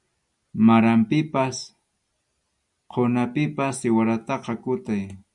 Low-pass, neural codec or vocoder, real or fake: 10.8 kHz; none; real